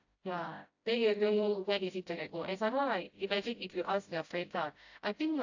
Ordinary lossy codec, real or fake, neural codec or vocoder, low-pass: AAC, 48 kbps; fake; codec, 16 kHz, 0.5 kbps, FreqCodec, smaller model; 7.2 kHz